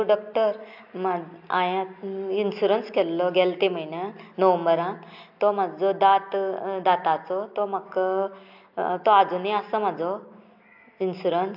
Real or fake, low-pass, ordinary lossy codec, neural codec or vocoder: real; 5.4 kHz; AAC, 48 kbps; none